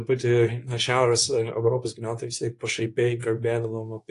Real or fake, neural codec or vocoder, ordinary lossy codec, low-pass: fake; codec, 24 kHz, 0.9 kbps, WavTokenizer, medium speech release version 2; AAC, 48 kbps; 10.8 kHz